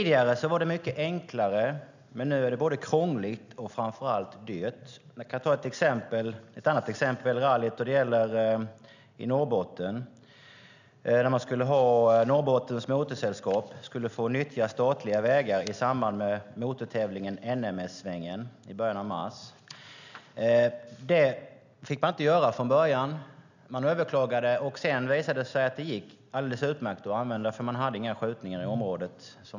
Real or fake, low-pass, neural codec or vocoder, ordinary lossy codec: real; 7.2 kHz; none; none